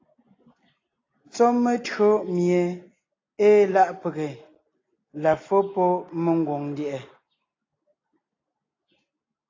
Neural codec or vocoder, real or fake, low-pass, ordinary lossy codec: none; real; 7.2 kHz; AAC, 32 kbps